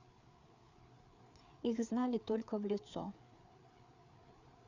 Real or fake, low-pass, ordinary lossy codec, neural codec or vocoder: fake; 7.2 kHz; none; codec, 16 kHz, 4 kbps, FreqCodec, larger model